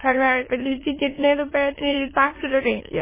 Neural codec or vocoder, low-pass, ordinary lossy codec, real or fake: autoencoder, 22.05 kHz, a latent of 192 numbers a frame, VITS, trained on many speakers; 3.6 kHz; MP3, 16 kbps; fake